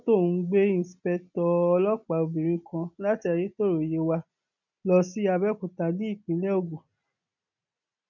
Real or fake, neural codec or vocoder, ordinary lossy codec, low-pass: real; none; none; 7.2 kHz